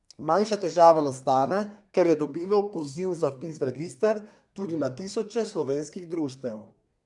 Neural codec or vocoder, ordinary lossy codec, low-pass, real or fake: codec, 24 kHz, 1 kbps, SNAC; none; 10.8 kHz; fake